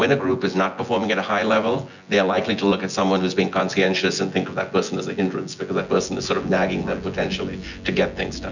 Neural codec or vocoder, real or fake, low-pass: vocoder, 24 kHz, 100 mel bands, Vocos; fake; 7.2 kHz